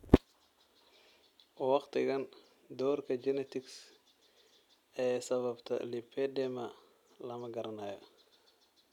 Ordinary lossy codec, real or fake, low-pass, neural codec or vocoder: none; real; 19.8 kHz; none